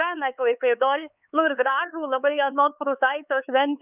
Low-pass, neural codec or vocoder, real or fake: 3.6 kHz; codec, 16 kHz, 4 kbps, X-Codec, HuBERT features, trained on LibriSpeech; fake